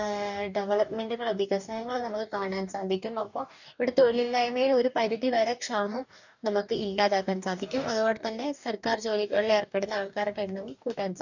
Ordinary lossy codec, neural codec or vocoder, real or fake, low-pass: none; codec, 44.1 kHz, 2.6 kbps, DAC; fake; 7.2 kHz